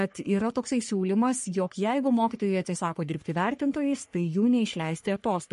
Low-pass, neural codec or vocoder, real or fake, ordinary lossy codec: 14.4 kHz; codec, 44.1 kHz, 3.4 kbps, Pupu-Codec; fake; MP3, 48 kbps